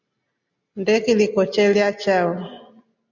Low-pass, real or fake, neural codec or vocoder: 7.2 kHz; real; none